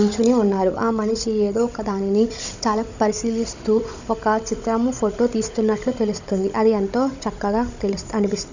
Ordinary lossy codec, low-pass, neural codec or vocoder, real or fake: none; 7.2 kHz; codec, 16 kHz, 16 kbps, FunCodec, trained on Chinese and English, 50 frames a second; fake